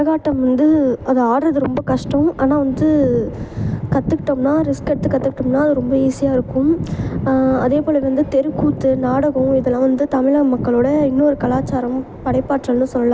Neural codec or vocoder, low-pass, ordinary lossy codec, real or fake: none; none; none; real